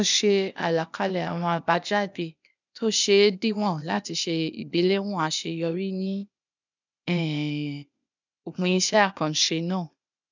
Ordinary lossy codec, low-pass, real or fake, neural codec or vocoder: none; 7.2 kHz; fake; codec, 16 kHz, 0.8 kbps, ZipCodec